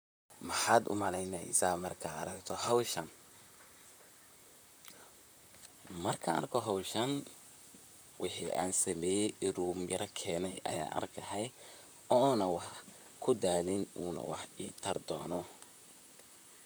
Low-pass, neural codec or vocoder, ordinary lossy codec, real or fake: none; vocoder, 44.1 kHz, 128 mel bands, Pupu-Vocoder; none; fake